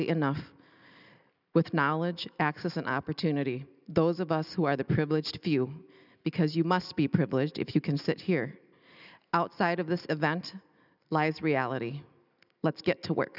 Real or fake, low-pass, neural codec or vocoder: real; 5.4 kHz; none